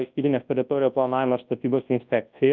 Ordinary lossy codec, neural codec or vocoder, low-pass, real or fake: Opus, 24 kbps; codec, 24 kHz, 0.9 kbps, WavTokenizer, large speech release; 7.2 kHz; fake